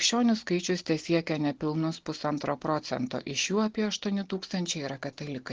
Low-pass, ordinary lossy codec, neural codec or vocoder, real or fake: 7.2 kHz; Opus, 16 kbps; none; real